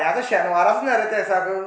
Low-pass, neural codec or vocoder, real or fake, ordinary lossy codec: none; none; real; none